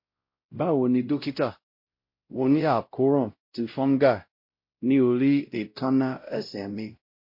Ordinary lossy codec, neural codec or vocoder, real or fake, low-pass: MP3, 32 kbps; codec, 16 kHz, 0.5 kbps, X-Codec, WavLM features, trained on Multilingual LibriSpeech; fake; 5.4 kHz